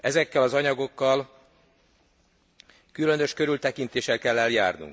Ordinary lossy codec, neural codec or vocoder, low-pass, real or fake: none; none; none; real